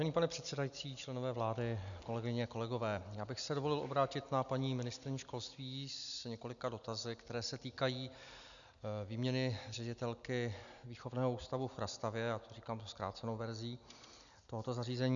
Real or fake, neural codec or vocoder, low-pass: real; none; 7.2 kHz